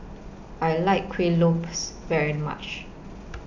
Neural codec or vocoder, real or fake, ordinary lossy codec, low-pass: none; real; none; 7.2 kHz